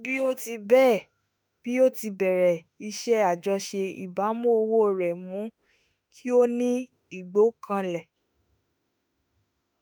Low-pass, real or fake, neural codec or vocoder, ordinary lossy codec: none; fake; autoencoder, 48 kHz, 32 numbers a frame, DAC-VAE, trained on Japanese speech; none